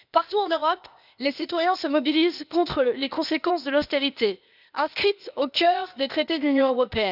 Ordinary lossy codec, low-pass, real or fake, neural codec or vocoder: none; 5.4 kHz; fake; codec, 16 kHz, 0.8 kbps, ZipCodec